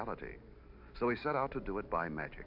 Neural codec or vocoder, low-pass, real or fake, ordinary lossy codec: none; 5.4 kHz; real; MP3, 48 kbps